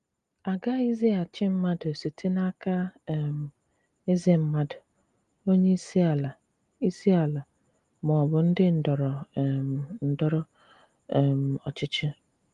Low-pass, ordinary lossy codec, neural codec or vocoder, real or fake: 10.8 kHz; Opus, 32 kbps; none; real